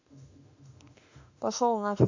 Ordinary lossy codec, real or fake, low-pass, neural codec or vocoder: AAC, 48 kbps; fake; 7.2 kHz; autoencoder, 48 kHz, 32 numbers a frame, DAC-VAE, trained on Japanese speech